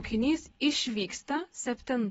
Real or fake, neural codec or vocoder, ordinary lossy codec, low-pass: fake; vocoder, 22.05 kHz, 80 mel bands, Vocos; AAC, 24 kbps; 9.9 kHz